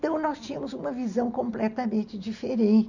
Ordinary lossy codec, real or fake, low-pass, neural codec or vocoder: none; real; 7.2 kHz; none